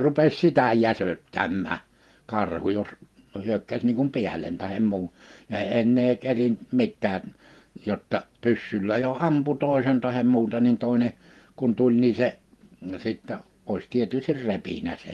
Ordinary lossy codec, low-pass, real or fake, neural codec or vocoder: Opus, 24 kbps; 19.8 kHz; fake; vocoder, 48 kHz, 128 mel bands, Vocos